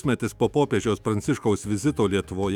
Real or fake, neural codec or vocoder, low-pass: fake; autoencoder, 48 kHz, 128 numbers a frame, DAC-VAE, trained on Japanese speech; 19.8 kHz